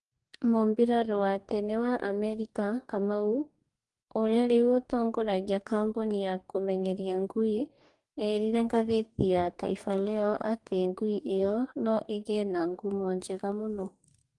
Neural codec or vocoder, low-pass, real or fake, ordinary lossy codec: codec, 44.1 kHz, 2.6 kbps, DAC; 10.8 kHz; fake; Opus, 24 kbps